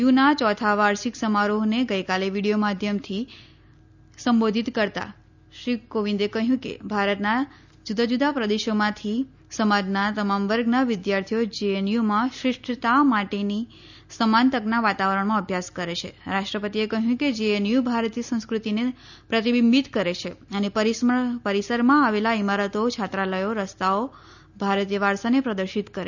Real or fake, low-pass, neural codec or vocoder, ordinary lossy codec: real; 7.2 kHz; none; none